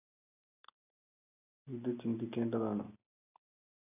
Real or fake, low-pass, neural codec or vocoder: real; 3.6 kHz; none